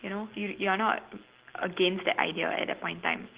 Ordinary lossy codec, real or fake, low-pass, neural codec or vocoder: Opus, 16 kbps; real; 3.6 kHz; none